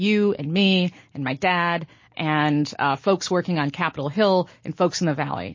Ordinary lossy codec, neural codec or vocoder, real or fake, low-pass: MP3, 32 kbps; none; real; 7.2 kHz